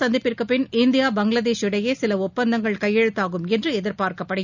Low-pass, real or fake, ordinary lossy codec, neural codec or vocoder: 7.2 kHz; real; none; none